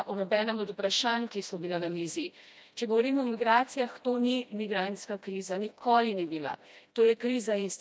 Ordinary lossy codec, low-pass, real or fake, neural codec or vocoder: none; none; fake; codec, 16 kHz, 1 kbps, FreqCodec, smaller model